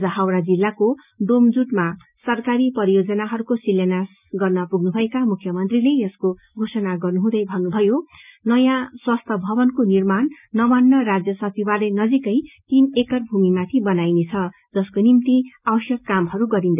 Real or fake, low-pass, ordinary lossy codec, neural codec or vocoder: real; 3.6 kHz; none; none